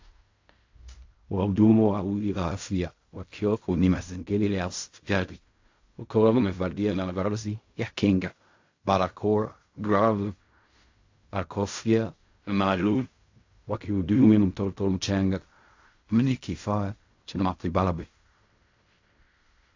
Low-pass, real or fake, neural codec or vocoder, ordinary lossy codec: 7.2 kHz; fake; codec, 16 kHz in and 24 kHz out, 0.4 kbps, LongCat-Audio-Codec, fine tuned four codebook decoder; AAC, 48 kbps